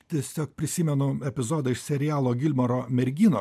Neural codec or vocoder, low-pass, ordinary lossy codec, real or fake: none; 14.4 kHz; MP3, 96 kbps; real